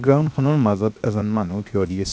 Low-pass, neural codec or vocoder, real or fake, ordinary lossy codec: none; codec, 16 kHz, 0.7 kbps, FocalCodec; fake; none